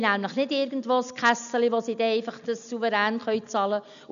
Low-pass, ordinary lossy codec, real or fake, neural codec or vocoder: 7.2 kHz; none; real; none